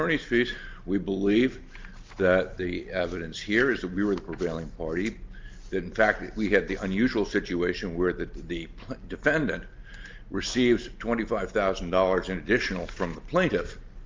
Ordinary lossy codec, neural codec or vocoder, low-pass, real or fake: Opus, 24 kbps; none; 7.2 kHz; real